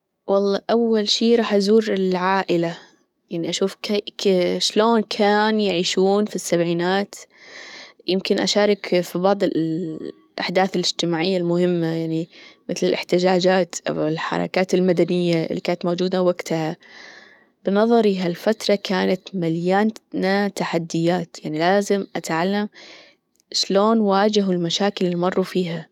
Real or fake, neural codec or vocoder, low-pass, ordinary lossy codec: fake; codec, 44.1 kHz, 7.8 kbps, DAC; 19.8 kHz; none